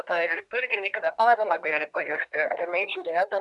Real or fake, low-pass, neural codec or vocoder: fake; 10.8 kHz; codec, 24 kHz, 1 kbps, SNAC